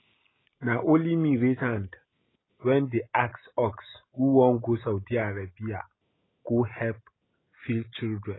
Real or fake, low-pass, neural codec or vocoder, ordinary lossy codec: real; 7.2 kHz; none; AAC, 16 kbps